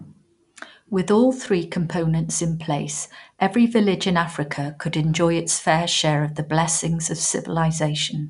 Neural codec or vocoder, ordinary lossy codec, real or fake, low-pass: none; none; real; 10.8 kHz